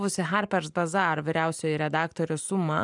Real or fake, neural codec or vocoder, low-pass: fake; vocoder, 44.1 kHz, 128 mel bands every 512 samples, BigVGAN v2; 10.8 kHz